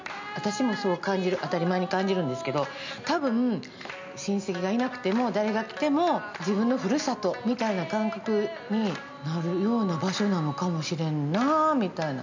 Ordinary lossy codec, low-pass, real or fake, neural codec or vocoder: MP3, 64 kbps; 7.2 kHz; real; none